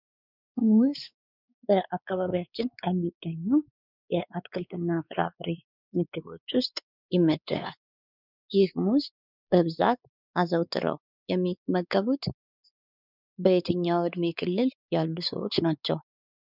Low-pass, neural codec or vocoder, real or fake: 5.4 kHz; codec, 16 kHz, 4 kbps, X-Codec, WavLM features, trained on Multilingual LibriSpeech; fake